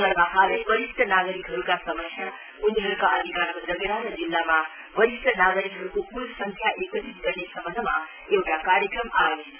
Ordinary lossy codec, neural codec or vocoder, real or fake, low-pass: none; none; real; 3.6 kHz